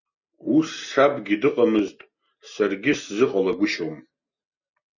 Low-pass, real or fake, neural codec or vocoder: 7.2 kHz; real; none